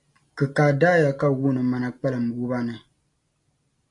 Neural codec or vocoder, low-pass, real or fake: none; 10.8 kHz; real